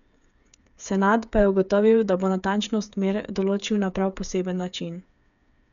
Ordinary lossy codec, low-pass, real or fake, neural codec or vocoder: none; 7.2 kHz; fake; codec, 16 kHz, 8 kbps, FreqCodec, smaller model